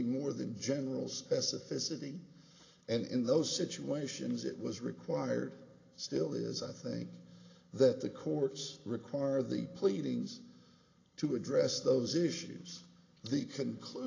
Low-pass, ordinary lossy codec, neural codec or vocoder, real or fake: 7.2 kHz; AAC, 32 kbps; none; real